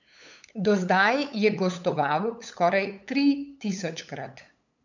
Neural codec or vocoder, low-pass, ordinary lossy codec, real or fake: codec, 16 kHz, 8 kbps, FunCodec, trained on LibriTTS, 25 frames a second; 7.2 kHz; none; fake